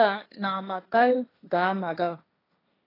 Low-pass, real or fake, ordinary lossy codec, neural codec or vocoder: 5.4 kHz; fake; AAC, 24 kbps; codec, 16 kHz, 1.1 kbps, Voila-Tokenizer